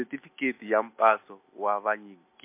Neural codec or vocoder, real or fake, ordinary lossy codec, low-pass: none; real; MP3, 24 kbps; 3.6 kHz